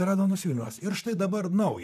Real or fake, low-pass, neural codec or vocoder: fake; 14.4 kHz; vocoder, 44.1 kHz, 128 mel bands, Pupu-Vocoder